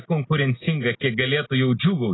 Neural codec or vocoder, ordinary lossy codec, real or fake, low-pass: none; AAC, 16 kbps; real; 7.2 kHz